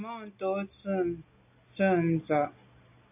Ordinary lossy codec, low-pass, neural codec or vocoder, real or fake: AAC, 32 kbps; 3.6 kHz; none; real